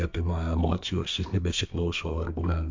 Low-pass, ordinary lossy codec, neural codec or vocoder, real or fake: 7.2 kHz; MP3, 48 kbps; codec, 32 kHz, 1.9 kbps, SNAC; fake